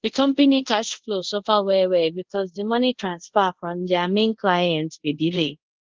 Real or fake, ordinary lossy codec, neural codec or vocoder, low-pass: fake; Opus, 16 kbps; codec, 24 kHz, 0.5 kbps, DualCodec; 7.2 kHz